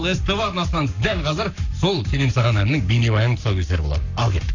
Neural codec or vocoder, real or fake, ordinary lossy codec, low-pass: codec, 44.1 kHz, 7.8 kbps, Pupu-Codec; fake; none; 7.2 kHz